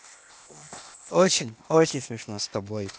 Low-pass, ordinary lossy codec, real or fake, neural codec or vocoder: none; none; fake; codec, 16 kHz, 0.8 kbps, ZipCodec